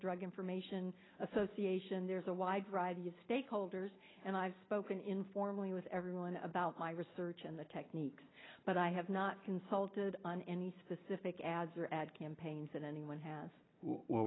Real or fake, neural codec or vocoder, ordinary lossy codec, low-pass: real; none; AAC, 16 kbps; 7.2 kHz